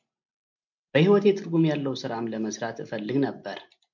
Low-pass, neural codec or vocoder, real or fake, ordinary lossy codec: 7.2 kHz; none; real; MP3, 64 kbps